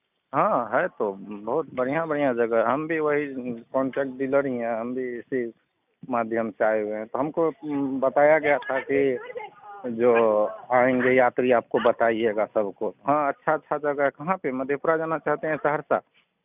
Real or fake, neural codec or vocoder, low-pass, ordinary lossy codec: real; none; 3.6 kHz; none